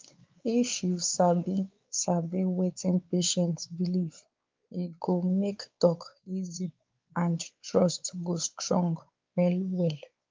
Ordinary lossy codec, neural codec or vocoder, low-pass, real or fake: Opus, 24 kbps; codec, 16 kHz, 4 kbps, X-Codec, WavLM features, trained on Multilingual LibriSpeech; 7.2 kHz; fake